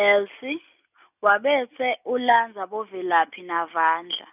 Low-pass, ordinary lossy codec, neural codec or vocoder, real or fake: 3.6 kHz; none; none; real